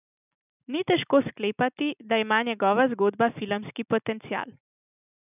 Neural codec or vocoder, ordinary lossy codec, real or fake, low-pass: none; none; real; 3.6 kHz